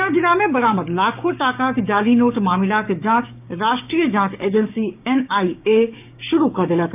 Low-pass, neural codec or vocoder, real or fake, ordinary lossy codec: 3.6 kHz; codec, 16 kHz, 6 kbps, DAC; fake; none